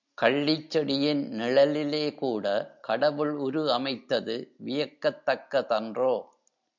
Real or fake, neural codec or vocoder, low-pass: real; none; 7.2 kHz